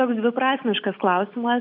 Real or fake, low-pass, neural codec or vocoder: real; 5.4 kHz; none